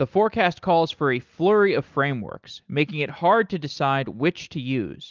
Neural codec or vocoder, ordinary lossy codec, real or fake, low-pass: none; Opus, 24 kbps; real; 7.2 kHz